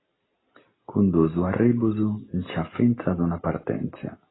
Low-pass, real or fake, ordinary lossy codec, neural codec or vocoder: 7.2 kHz; real; AAC, 16 kbps; none